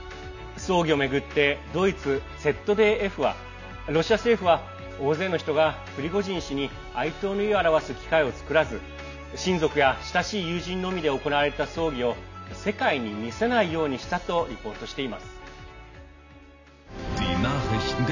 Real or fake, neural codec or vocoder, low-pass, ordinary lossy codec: real; none; 7.2 kHz; none